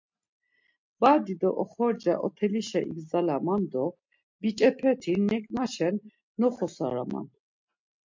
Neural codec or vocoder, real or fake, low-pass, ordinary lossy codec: none; real; 7.2 kHz; MP3, 48 kbps